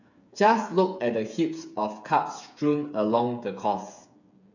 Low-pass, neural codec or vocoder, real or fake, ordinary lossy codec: 7.2 kHz; codec, 16 kHz, 8 kbps, FreqCodec, smaller model; fake; none